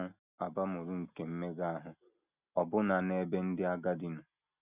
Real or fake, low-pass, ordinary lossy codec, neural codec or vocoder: real; 3.6 kHz; none; none